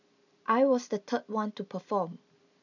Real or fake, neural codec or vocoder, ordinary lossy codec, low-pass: real; none; none; 7.2 kHz